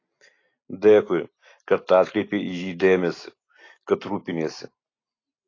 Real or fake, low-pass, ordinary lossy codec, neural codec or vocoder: real; 7.2 kHz; AAC, 32 kbps; none